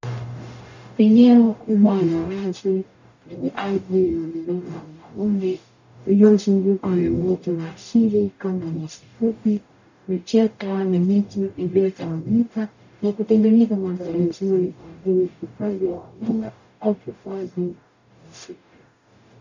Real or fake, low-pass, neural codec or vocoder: fake; 7.2 kHz; codec, 44.1 kHz, 0.9 kbps, DAC